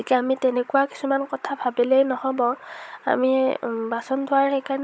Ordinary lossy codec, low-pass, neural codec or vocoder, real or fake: none; none; codec, 16 kHz, 16 kbps, FunCodec, trained on Chinese and English, 50 frames a second; fake